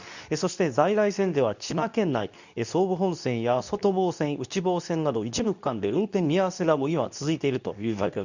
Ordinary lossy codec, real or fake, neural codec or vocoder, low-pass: none; fake; codec, 24 kHz, 0.9 kbps, WavTokenizer, medium speech release version 2; 7.2 kHz